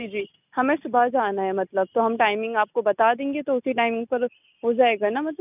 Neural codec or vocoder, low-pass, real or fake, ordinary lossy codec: none; 3.6 kHz; real; none